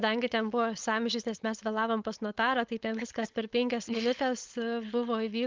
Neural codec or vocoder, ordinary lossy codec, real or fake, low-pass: codec, 16 kHz, 4.8 kbps, FACodec; Opus, 32 kbps; fake; 7.2 kHz